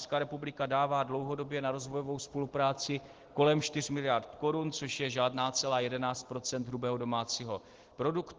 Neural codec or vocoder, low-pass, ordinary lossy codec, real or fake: none; 7.2 kHz; Opus, 16 kbps; real